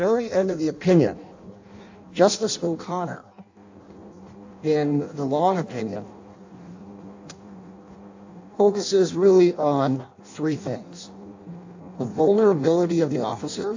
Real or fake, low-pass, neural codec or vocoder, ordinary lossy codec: fake; 7.2 kHz; codec, 16 kHz in and 24 kHz out, 0.6 kbps, FireRedTTS-2 codec; AAC, 48 kbps